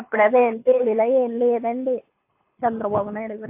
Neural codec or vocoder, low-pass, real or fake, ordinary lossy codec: codec, 24 kHz, 6 kbps, HILCodec; 3.6 kHz; fake; AAC, 24 kbps